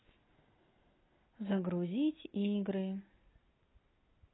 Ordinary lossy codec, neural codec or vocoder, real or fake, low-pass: AAC, 16 kbps; none; real; 7.2 kHz